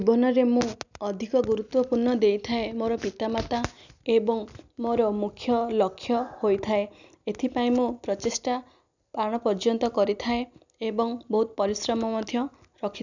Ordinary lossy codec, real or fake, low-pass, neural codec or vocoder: none; real; 7.2 kHz; none